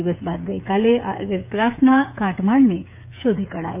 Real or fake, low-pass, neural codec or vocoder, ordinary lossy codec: fake; 3.6 kHz; codec, 16 kHz, 8 kbps, FreqCodec, smaller model; none